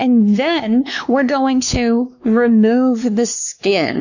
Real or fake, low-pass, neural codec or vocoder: fake; 7.2 kHz; codec, 16 kHz, 2 kbps, X-Codec, WavLM features, trained on Multilingual LibriSpeech